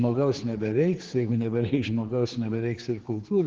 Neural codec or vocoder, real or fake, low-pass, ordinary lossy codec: codec, 16 kHz, 4 kbps, X-Codec, HuBERT features, trained on general audio; fake; 7.2 kHz; Opus, 16 kbps